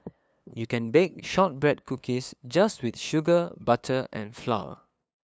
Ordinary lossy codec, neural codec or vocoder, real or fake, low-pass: none; codec, 16 kHz, 2 kbps, FunCodec, trained on LibriTTS, 25 frames a second; fake; none